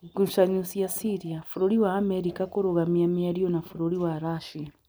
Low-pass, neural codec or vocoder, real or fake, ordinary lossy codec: none; none; real; none